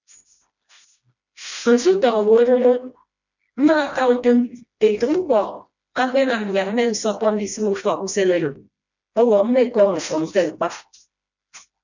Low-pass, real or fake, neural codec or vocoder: 7.2 kHz; fake; codec, 16 kHz, 1 kbps, FreqCodec, smaller model